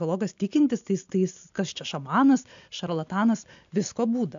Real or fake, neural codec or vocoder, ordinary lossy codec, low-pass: real; none; MP3, 64 kbps; 7.2 kHz